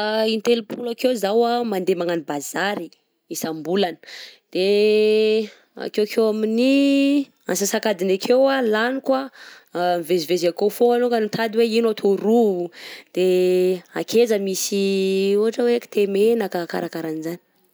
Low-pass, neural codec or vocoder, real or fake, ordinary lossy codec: none; none; real; none